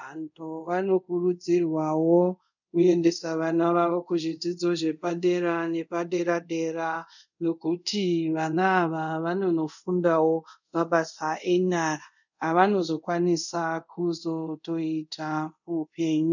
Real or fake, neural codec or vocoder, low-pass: fake; codec, 24 kHz, 0.5 kbps, DualCodec; 7.2 kHz